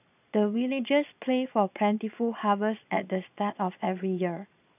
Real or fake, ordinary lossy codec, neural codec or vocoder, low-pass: fake; none; vocoder, 22.05 kHz, 80 mel bands, Vocos; 3.6 kHz